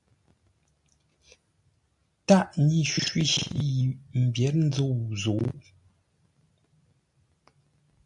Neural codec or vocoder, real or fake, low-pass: none; real; 10.8 kHz